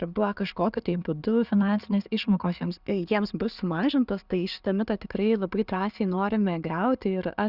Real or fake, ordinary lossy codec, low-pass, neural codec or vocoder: real; Opus, 64 kbps; 5.4 kHz; none